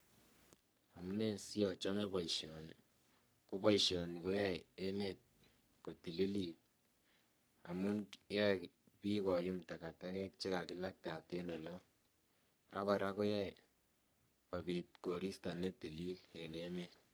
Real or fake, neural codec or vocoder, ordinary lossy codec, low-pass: fake; codec, 44.1 kHz, 3.4 kbps, Pupu-Codec; none; none